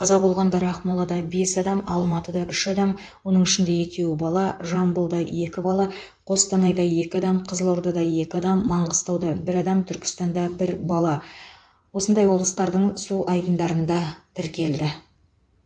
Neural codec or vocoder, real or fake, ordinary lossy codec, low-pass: codec, 16 kHz in and 24 kHz out, 2.2 kbps, FireRedTTS-2 codec; fake; AAC, 64 kbps; 9.9 kHz